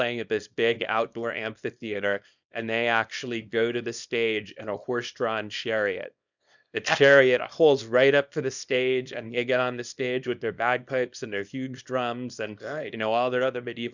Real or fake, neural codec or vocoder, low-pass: fake; codec, 24 kHz, 0.9 kbps, WavTokenizer, small release; 7.2 kHz